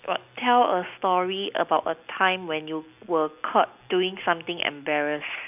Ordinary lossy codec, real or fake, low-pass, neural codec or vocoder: none; real; 3.6 kHz; none